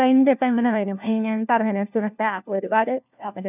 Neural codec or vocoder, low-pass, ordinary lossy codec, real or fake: codec, 16 kHz, 1 kbps, FunCodec, trained on LibriTTS, 50 frames a second; 3.6 kHz; none; fake